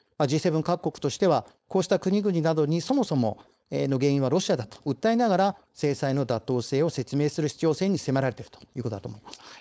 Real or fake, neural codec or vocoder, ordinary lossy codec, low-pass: fake; codec, 16 kHz, 4.8 kbps, FACodec; none; none